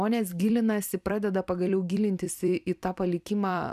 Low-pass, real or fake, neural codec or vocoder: 14.4 kHz; fake; vocoder, 44.1 kHz, 128 mel bands every 256 samples, BigVGAN v2